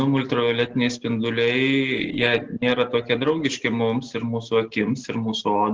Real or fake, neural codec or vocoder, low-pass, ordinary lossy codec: real; none; 7.2 kHz; Opus, 16 kbps